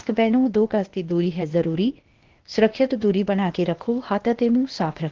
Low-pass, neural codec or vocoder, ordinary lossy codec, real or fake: 7.2 kHz; codec, 16 kHz, 0.8 kbps, ZipCodec; Opus, 16 kbps; fake